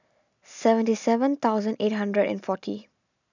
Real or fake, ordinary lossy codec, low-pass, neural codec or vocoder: real; none; 7.2 kHz; none